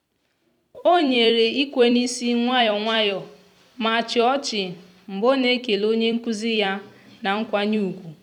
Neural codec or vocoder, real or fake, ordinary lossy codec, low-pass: vocoder, 44.1 kHz, 128 mel bands every 256 samples, BigVGAN v2; fake; none; 19.8 kHz